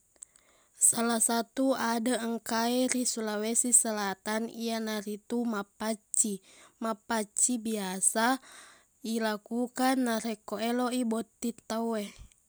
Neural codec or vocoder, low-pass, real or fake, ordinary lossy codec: none; none; real; none